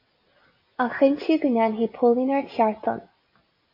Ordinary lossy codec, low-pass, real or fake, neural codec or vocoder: MP3, 24 kbps; 5.4 kHz; real; none